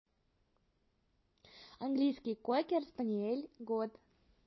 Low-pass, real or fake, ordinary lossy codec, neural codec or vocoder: 7.2 kHz; real; MP3, 24 kbps; none